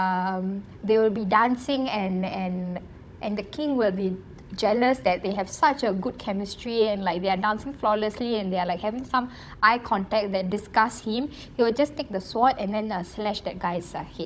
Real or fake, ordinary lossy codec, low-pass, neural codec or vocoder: fake; none; none; codec, 16 kHz, 16 kbps, FunCodec, trained on Chinese and English, 50 frames a second